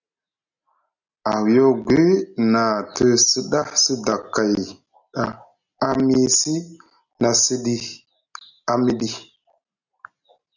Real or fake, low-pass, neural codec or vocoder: real; 7.2 kHz; none